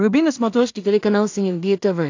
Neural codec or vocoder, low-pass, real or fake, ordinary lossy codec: codec, 16 kHz in and 24 kHz out, 0.4 kbps, LongCat-Audio-Codec, two codebook decoder; 7.2 kHz; fake; AAC, 48 kbps